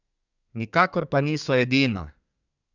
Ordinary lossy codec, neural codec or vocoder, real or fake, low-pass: none; codec, 32 kHz, 1.9 kbps, SNAC; fake; 7.2 kHz